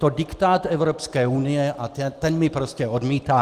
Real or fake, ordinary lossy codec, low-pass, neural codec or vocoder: real; Opus, 24 kbps; 14.4 kHz; none